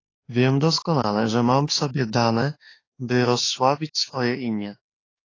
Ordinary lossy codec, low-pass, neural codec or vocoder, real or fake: AAC, 32 kbps; 7.2 kHz; autoencoder, 48 kHz, 32 numbers a frame, DAC-VAE, trained on Japanese speech; fake